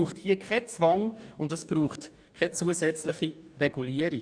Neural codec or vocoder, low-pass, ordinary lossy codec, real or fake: codec, 44.1 kHz, 2.6 kbps, DAC; 9.9 kHz; none; fake